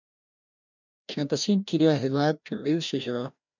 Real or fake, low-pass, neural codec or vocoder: fake; 7.2 kHz; codec, 16 kHz, 1 kbps, FreqCodec, larger model